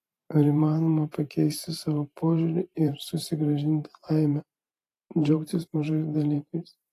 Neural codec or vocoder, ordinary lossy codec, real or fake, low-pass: vocoder, 44.1 kHz, 128 mel bands every 512 samples, BigVGAN v2; AAC, 48 kbps; fake; 14.4 kHz